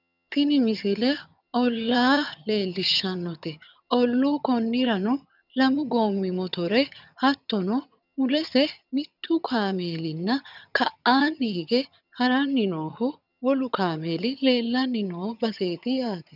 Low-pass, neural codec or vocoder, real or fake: 5.4 kHz; vocoder, 22.05 kHz, 80 mel bands, HiFi-GAN; fake